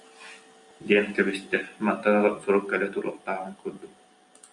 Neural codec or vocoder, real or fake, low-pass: none; real; 10.8 kHz